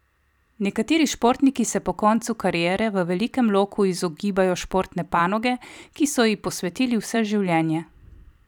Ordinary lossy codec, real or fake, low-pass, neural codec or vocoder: none; fake; 19.8 kHz; vocoder, 44.1 kHz, 128 mel bands every 256 samples, BigVGAN v2